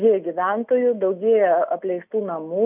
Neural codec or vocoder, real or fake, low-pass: none; real; 3.6 kHz